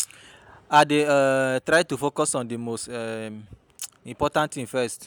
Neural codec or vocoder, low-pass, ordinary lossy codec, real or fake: none; none; none; real